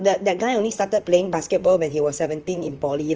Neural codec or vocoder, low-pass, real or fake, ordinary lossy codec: vocoder, 44.1 kHz, 128 mel bands, Pupu-Vocoder; 7.2 kHz; fake; Opus, 24 kbps